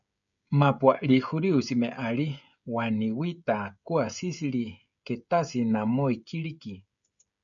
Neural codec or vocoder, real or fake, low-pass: codec, 16 kHz, 16 kbps, FreqCodec, smaller model; fake; 7.2 kHz